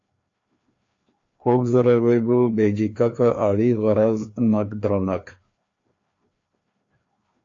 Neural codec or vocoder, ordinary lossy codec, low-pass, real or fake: codec, 16 kHz, 2 kbps, FreqCodec, larger model; AAC, 48 kbps; 7.2 kHz; fake